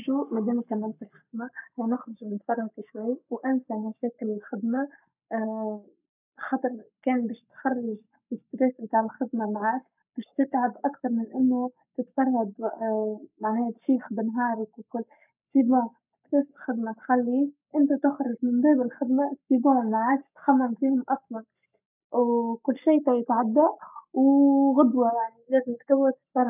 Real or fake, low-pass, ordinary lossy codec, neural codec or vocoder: fake; 3.6 kHz; none; codec, 44.1 kHz, 7.8 kbps, Pupu-Codec